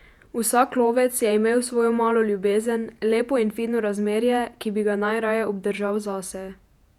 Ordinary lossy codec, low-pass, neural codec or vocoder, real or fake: none; 19.8 kHz; vocoder, 48 kHz, 128 mel bands, Vocos; fake